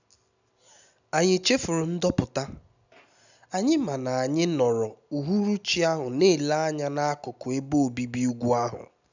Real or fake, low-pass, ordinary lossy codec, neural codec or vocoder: real; 7.2 kHz; none; none